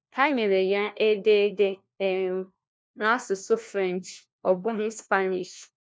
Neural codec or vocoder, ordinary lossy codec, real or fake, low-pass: codec, 16 kHz, 1 kbps, FunCodec, trained on LibriTTS, 50 frames a second; none; fake; none